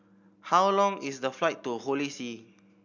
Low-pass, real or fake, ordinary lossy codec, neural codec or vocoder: 7.2 kHz; real; none; none